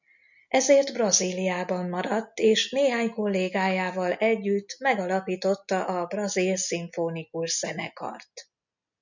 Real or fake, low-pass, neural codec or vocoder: real; 7.2 kHz; none